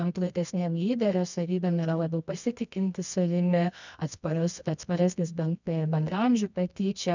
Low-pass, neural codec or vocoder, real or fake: 7.2 kHz; codec, 24 kHz, 0.9 kbps, WavTokenizer, medium music audio release; fake